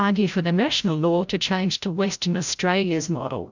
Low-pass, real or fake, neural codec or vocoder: 7.2 kHz; fake; codec, 16 kHz, 0.5 kbps, FreqCodec, larger model